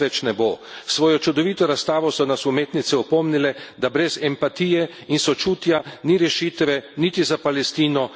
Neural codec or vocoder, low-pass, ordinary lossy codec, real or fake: none; none; none; real